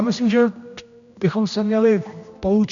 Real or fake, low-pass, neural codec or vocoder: fake; 7.2 kHz; codec, 16 kHz, 1 kbps, X-Codec, HuBERT features, trained on general audio